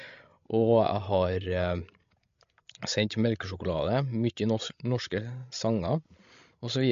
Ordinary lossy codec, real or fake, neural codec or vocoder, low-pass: MP3, 48 kbps; fake; codec, 16 kHz, 16 kbps, FreqCodec, larger model; 7.2 kHz